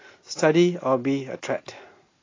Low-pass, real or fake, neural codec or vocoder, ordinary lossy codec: 7.2 kHz; real; none; AAC, 32 kbps